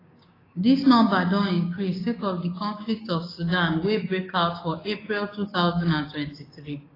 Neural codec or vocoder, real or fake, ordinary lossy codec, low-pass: codec, 16 kHz, 6 kbps, DAC; fake; AAC, 24 kbps; 5.4 kHz